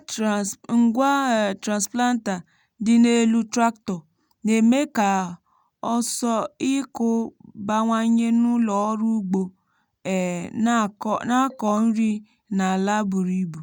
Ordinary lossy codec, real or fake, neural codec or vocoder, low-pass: none; real; none; 19.8 kHz